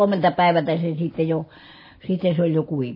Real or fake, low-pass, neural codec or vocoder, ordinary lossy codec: real; 5.4 kHz; none; MP3, 24 kbps